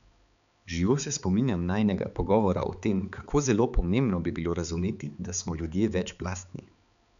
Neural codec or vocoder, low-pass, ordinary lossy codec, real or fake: codec, 16 kHz, 4 kbps, X-Codec, HuBERT features, trained on balanced general audio; 7.2 kHz; none; fake